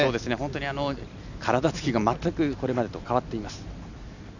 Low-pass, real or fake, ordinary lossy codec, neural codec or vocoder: 7.2 kHz; real; none; none